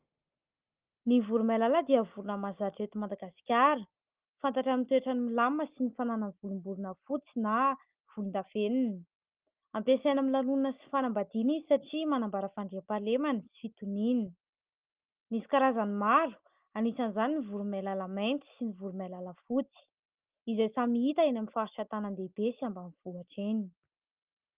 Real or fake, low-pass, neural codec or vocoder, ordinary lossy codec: real; 3.6 kHz; none; Opus, 32 kbps